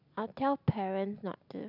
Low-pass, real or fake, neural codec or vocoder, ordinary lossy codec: 5.4 kHz; real; none; none